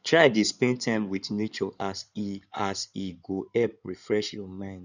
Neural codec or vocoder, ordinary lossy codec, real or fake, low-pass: codec, 16 kHz in and 24 kHz out, 2.2 kbps, FireRedTTS-2 codec; none; fake; 7.2 kHz